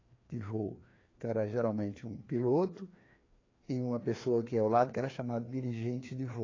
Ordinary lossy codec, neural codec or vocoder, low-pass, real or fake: AAC, 32 kbps; codec, 16 kHz, 2 kbps, FreqCodec, larger model; 7.2 kHz; fake